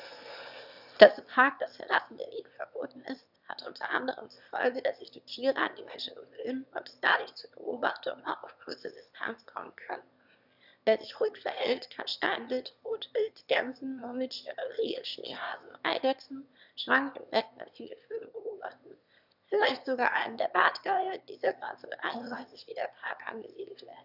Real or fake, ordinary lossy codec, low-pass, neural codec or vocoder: fake; none; 5.4 kHz; autoencoder, 22.05 kHz, a latent of 192 numbers a frame, VITS, trained on one speaker